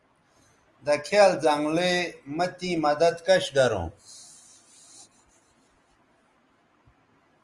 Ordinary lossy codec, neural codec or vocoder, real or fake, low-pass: Opus, 32 kbps; none; real; 10.8 kHz